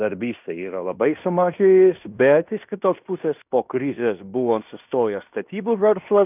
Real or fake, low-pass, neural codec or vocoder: fake; 3.6 kHz; codec, 16 kHz in and 24 kHz out, 0.9 kbps, LongCat-Audio-Codec, fine tuned four codebook decoder